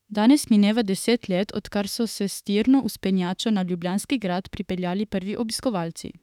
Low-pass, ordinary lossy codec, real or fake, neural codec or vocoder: 19.8 kHz; none; fake; autoencoder, 48 kHz, 32 numbers a frame, DAC-VAE, trained on Japanese speech